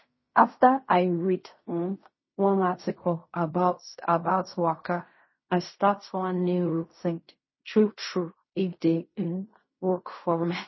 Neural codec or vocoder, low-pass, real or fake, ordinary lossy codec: codec, 16 kHz in and 24 kHz out, 0.4 kbps, LongCat-Audio-Codec, fine tuned four codebook decoder; 7.2 kHz; fake; MP3, 24 kbps